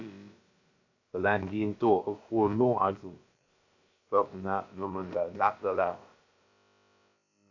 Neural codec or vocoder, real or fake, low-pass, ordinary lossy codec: codec, 16 kHz, about 1 kbps, DyCAST, with the encoder's durations; fake; 7.2 kHz; AAC, 48 kbps